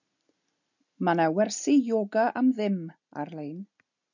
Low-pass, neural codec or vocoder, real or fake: 7.2 kHz; none; real